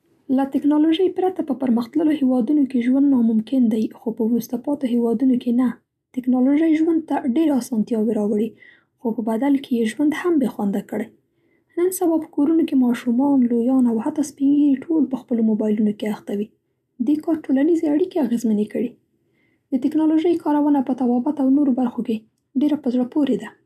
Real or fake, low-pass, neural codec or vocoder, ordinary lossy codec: real; 14.4 kHz; none; none